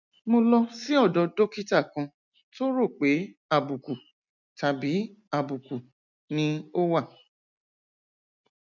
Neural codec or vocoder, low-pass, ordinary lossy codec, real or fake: none; 7.2 kHz; none; real